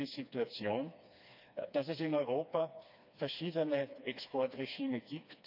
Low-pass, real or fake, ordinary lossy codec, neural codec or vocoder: 5.4 kHz; fake; none; codec, 16 kHz, 2 kbps, FreqCodec, smaller model